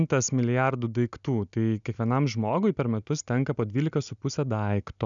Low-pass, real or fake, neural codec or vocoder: 7.2 kHz; real; none